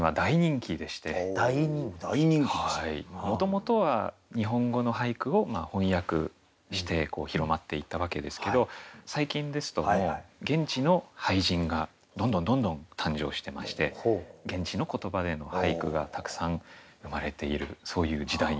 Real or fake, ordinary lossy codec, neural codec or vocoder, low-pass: real; none; none; none